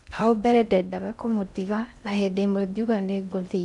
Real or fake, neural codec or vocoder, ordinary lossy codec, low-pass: fake; codec, 16 kHz in and 24 kHz out, 0.6 kbps, FocalCodec, streaming, 4096 codes; none; 10.8 kHz